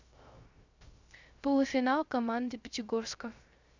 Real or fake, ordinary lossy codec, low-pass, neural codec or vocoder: fake; Opus, 64 kbps; 7.2 kHz; codec, 16 kHz, 0.3 kbps, FocalCodec